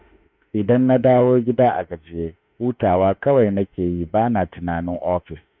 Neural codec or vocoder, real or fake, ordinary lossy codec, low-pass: autoencoder, 48 kHz, 32 numbers a frame, DAC-VAE, trained on Japanese speech; fake; none; 7.2 kHz